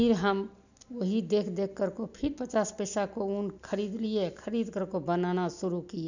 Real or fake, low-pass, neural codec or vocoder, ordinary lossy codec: real; 7.2 kHz; none; none